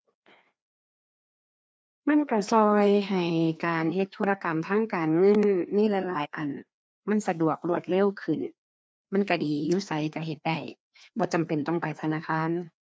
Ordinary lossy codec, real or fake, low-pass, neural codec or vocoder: none; fake; none; codec, 16 kHz, 2 kbps, FreqCodec, larger model